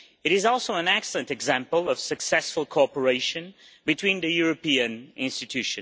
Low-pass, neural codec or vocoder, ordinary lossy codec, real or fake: none; none; none; real